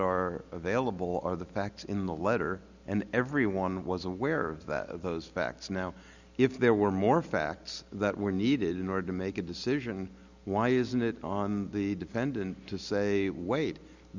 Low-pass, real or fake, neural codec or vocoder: 7.2 kHz; real; none